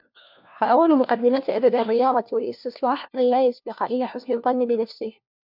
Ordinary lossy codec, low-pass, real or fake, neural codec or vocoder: MP3, 48 kbps; 5.4 kHz; fake; codec, 16 kHz, 1 kbps, FunCodec, trained on LibriTTS, 50 frames a second